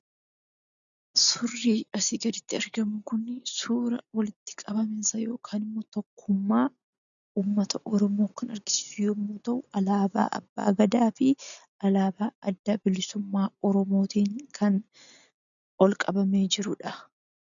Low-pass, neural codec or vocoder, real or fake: 7.2 kHz; none; real